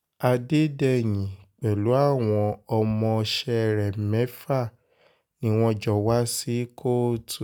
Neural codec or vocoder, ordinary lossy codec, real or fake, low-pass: none; none; real; none